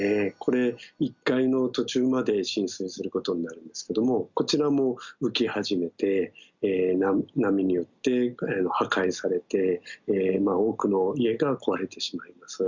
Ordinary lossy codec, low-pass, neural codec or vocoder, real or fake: Opus, 64 kbps; 7.2 kHz; none; real